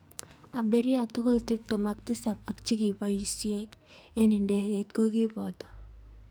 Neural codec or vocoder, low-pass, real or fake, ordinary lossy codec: codec, 44.1 kHz, 2.6 kbps, SNAC; none; fake; none